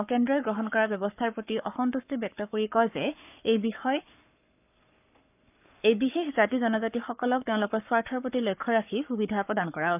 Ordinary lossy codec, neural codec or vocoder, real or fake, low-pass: none; codec, 44.1 kHz, 7.8 kbps, Pupu-Codec; fake; 3.6 kHz